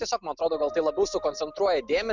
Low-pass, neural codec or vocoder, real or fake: 7.2 kHz; none; real